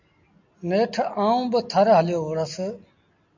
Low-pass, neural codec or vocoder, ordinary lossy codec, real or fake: 7.2 kHz; none; MP3, 64 kbps; real